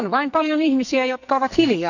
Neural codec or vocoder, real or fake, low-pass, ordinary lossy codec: codec, 44.1 kHz, 2.6 kbps, SNAC; fake; 7.2 kHz; none